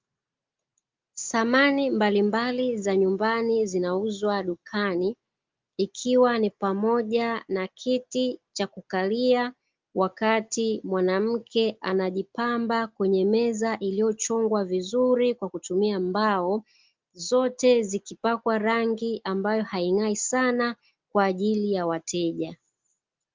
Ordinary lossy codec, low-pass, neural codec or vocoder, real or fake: Opus, 24 kbps; 7.2 kHz; none; real